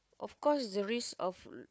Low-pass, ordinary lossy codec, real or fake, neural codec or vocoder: none; none; fake; codec, 16 kHz, 4 kbps, FunCodec, trained on Chinese and English, 50 frames a second